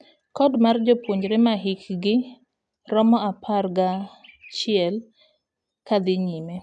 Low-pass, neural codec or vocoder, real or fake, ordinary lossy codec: 9.9 kHz; none; real; none